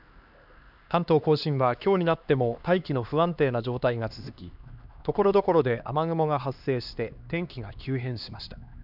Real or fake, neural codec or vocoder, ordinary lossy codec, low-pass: fake; codec, 16 kHz, 2 kbps, X-Codec, HuBERT features, trained on LibriSpeech; none; 5.4 kHz